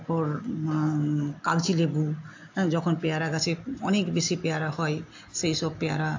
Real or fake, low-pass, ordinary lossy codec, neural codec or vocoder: real; 7.2 kHz; AAC, 48 kbps; none